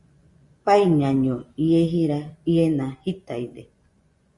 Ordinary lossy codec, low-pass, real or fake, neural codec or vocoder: Opus, 64 kbps; 10.8 kHz; fake; vocoder, 24 kHz, 100 mel bands, Vocos